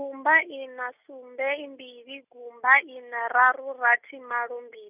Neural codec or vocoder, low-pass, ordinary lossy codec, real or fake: none; 3.6 kHz; none; real